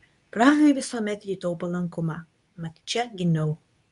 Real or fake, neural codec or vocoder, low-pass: fake; codec, 24 kHz, 0.9 kbps, WavTokenizer, medium speech release version 2; 10.8 kHz